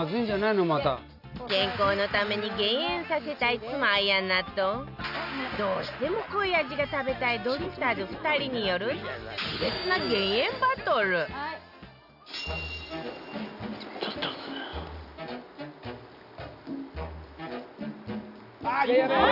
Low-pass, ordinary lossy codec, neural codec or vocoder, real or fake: 5.4 kHz; none; none; real